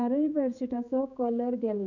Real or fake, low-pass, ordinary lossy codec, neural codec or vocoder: fake; 7.2 kHz; none; codec, 16 kHz, 4 kbps, X-Codec, HuBERT features, trained on general audio